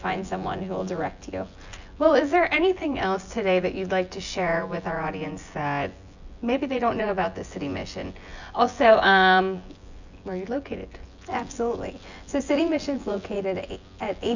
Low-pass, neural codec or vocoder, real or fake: 7.2 kHz; vocoder, 24 kHz, 100 mel bands, Vocos; fake